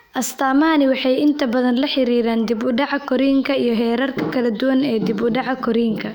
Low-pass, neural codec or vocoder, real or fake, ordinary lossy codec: 19.8 kHz; autoencoder, 48 kHz, 128 numbers a frame, DAC-VAE, trained on Japanese speech; fake; none